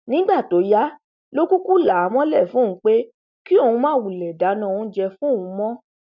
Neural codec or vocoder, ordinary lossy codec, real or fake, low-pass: none; none; real; 7.2 kHz